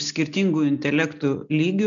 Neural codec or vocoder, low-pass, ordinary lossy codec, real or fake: none; 7.2 kHz; MP3, 96 kbps; real